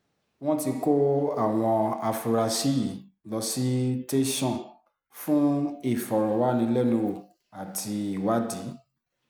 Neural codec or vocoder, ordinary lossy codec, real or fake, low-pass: none; none; real; none